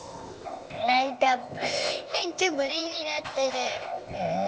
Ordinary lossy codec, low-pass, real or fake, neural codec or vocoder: none; none; fake; codec, 16 kHz, 0.8 kbps, ZipCodec